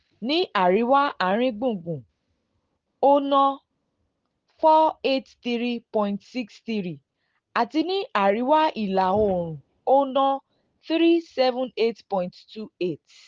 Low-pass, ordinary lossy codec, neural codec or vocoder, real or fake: 7.2 kHz; Opus, 16 kbps; none; real